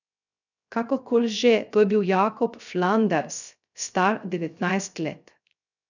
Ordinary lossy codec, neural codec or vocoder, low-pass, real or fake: none; codec, 16 kHz, 0.3 kbps, FocalCodec; 7.2 kHz; fake